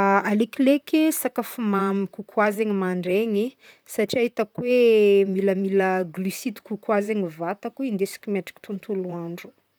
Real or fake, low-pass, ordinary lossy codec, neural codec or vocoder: fake; none; none; vocoder, 44.1 kHz, 128 mel bands, Pupu-Vocoder